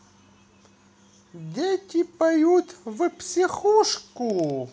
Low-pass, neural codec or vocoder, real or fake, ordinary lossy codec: none; none; real; none